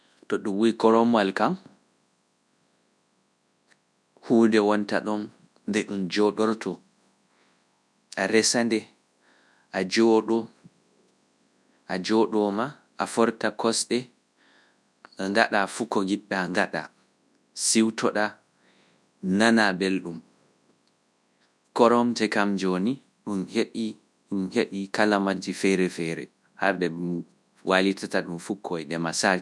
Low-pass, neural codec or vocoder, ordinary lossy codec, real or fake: none; codec, 24 kHz, 0.9 kbps, WavTokenizer, large speech release; none; fake